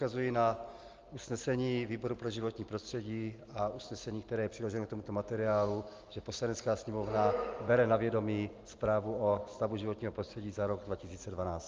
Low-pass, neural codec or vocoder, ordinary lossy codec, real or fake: 7.2 kHz; none; Opus, 32 kbps; real